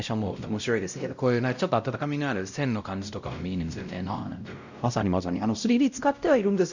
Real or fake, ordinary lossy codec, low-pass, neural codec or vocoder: fake; none; 7.2 kHz; codec, 16 kHz, 0.5 kbps, X-Codec, WavLM features, trained on Multilingual LibriSpeech